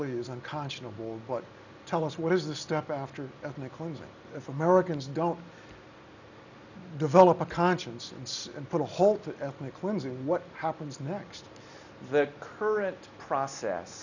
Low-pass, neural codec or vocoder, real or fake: 7.2 kHz; none; real